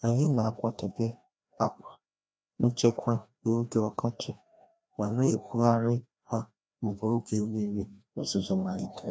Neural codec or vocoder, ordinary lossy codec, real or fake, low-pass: codec, 16 kHz, 1 kbps, FreqCodec, larger model; none; fake; none